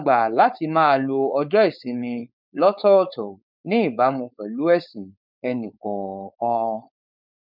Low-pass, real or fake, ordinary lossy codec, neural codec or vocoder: 5.4 kHz; fake; none; codec, 16 kHz, 4.8 kbps, FACodec